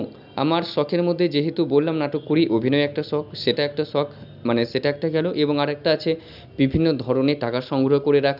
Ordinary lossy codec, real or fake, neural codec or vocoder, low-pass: none; real; none; 5.4 kHz